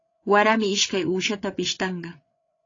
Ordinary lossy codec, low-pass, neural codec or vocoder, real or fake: AAC, 32 kbps; 7.2 kHz; codec, 16 kHz, 8 kbps, FreqCodec, larger model; fake